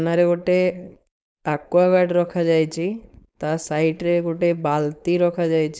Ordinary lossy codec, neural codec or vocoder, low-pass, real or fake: none; codec, 16 kHz, 4.8 kbps, FACodec; none; fake